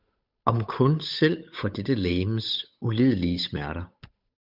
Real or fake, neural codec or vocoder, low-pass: fake; codec, 16 kHz, 8 kbps, FunCodec, trained on Chinese and English, 25 frames a second; 5.4 kHz